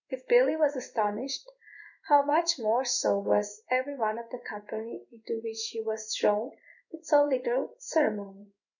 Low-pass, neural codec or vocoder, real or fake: 7.2 kHz; codec, 16 kHz in and 24 kHz out, 1 kbps, XY-Tokenizer; fake